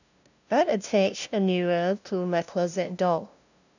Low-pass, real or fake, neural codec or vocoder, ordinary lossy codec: 7.2 kHz; fake; codec, 16 kHz, 0.5 kbps, FunCodec, trained on LibriTTS, 25 frames a second; none